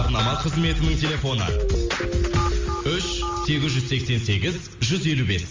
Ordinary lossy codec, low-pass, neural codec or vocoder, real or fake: Opus, 32 kbps; 7.2 kHz; none; real